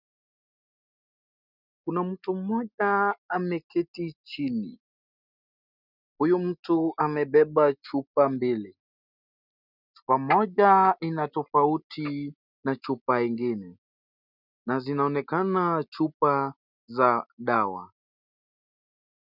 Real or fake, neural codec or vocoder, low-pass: real; none; 5.4 kHz